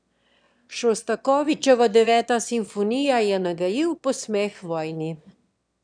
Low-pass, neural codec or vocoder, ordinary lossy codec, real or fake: 9.9 kHz; autoencoder, 22.05 kHz, a latent of 192 numbers a frame, VITS, trained on one speaker; none; fake